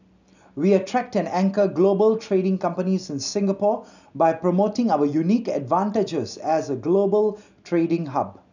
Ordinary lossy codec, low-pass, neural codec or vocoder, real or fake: none; 7.2 kHz; none; real